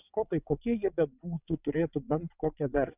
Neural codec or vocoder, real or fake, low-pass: vocoder, 22.05 kHz, 80 mel bands, WaveNeXt; fake; 3.6 kHz